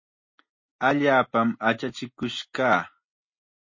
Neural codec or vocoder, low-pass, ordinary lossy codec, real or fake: none; 7.2 kHz; MP3, 32 kbps; real